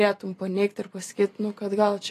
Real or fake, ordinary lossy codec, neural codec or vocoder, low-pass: fake; AAC, 48 kbps; vocoder, 44.1 kHz, 128 mel bands every 256 samples, BigVGAN v2; 14.4 kHz